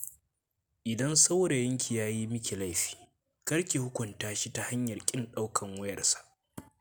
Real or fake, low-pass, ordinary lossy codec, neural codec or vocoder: real; none; none; none